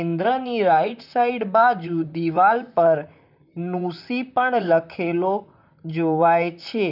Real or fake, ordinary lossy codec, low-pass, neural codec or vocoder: fake; none; 5.4 kHz; vocoder, 44.1 kHz, 128 mel bands, Pupu-Vocoder